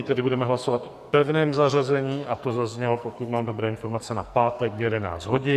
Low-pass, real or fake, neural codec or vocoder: 14.4 kHz; fake; codec, 44.1 kHz, 2.6 kbps, SNAC